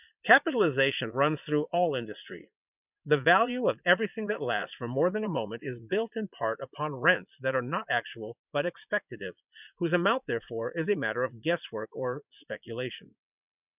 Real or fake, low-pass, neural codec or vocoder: fake; 3.6 kHz; vocoder, 22.05 kHz, 80 mel bands, Vocos